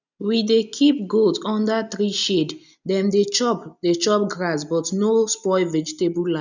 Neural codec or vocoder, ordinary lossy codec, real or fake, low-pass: none; none; real; 7.2 kHz